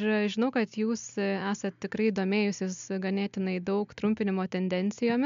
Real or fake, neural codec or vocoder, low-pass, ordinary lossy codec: real; none; 7.2 kHz; MP3, 64 kbps